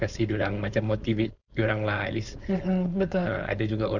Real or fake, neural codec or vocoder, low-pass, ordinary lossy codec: fake; codec, 16 kHz, 4.8 kbps, FACodec; 7.2 kHz; none